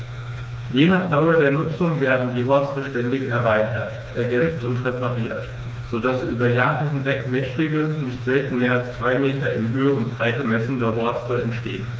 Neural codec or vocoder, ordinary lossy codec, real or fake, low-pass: codec, 16 kHz, 2 kbps, FreqCodec, smaller model; none; fake; none